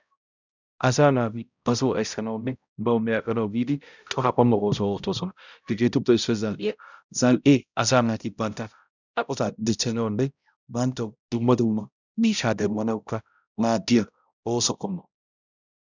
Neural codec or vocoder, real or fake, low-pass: codec, 16 kHz, 0.5 kbps, X-Codec, HuBERT features, trained on balanced general audio; fake; 7.2 kHz